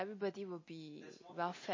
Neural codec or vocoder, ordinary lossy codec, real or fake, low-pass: none; MP3, 32 kbps; real; 7.2 kHz